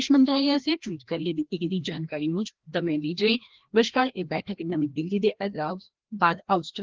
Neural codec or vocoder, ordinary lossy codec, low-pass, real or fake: codec, 16 kHz, 1 kbps, FreqCodec, larger model; Opus, 16 kbps; 7.2 kHz; fake